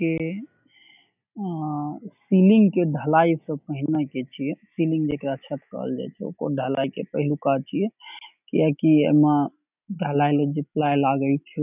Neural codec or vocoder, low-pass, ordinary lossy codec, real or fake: none; 3.6 kHz; none; real